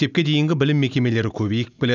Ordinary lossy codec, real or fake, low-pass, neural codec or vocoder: none; real; 7.2 kHz; none